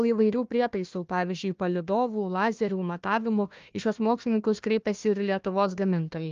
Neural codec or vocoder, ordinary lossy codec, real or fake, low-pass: codec, 16 kHz, 1 kbps, FunCodec, trained on Chinese and English, 50 frames a second; Opus, 32 kbps; fake; 7.2 kHz